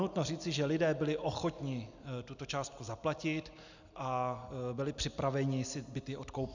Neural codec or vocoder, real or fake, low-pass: none; real; 7.2 kHz